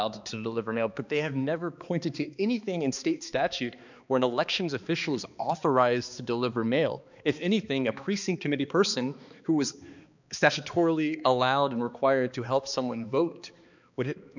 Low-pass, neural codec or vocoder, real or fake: 7.2 kHz; codec, 16 kHz, 2 kbps, X-Codec, HuBERT features, trained on balanced general audio; fake